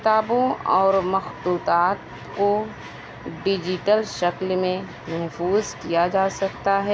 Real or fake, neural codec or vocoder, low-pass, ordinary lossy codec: real; none; none; none